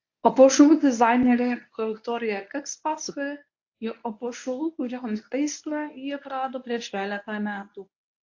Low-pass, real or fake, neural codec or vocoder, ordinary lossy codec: 7.2 kHz; fake; codec, 24 kHz, 0.9 kbps, WavTokenizer, medium speech release version 1; AAC, 48 kbps